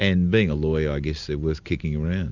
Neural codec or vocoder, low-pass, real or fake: none; 7.2 kHz; real